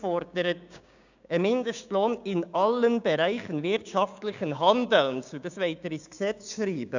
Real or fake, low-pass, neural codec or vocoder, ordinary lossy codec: fake; 7.2 kHz; codec, 16 kHz, 6 kbps, DAC; none